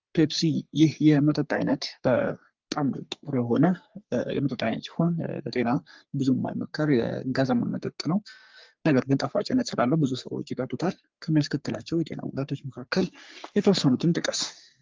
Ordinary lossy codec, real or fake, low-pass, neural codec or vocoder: Opus, 32 kbps; fake; 7.2 kHz; codec, 44.1 kHz, 3.4 kbps, Pupu-Codec